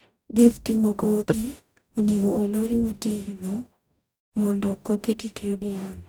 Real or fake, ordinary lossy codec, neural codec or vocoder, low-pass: fake; none; codec, 44.1 kHz, 0.9 kbps, DAC; none